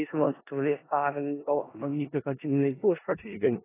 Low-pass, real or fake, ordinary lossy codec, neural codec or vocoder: 3.6 kHz; fake; AAC, 16 kbps; codec, 16 kHz in and 24 kHz out, 0.4 kbps, LongCat-Audio-Codec, four codebook decoder